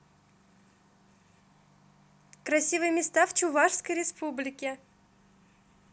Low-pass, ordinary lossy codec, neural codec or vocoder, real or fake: none; none; none; real